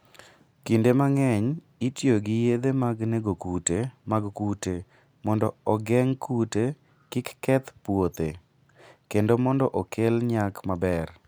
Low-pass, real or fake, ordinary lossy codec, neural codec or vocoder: none; real; none; none